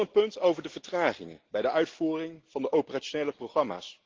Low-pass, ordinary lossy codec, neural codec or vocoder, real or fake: 7.2 kHz; Opus, 16 kbps; none; real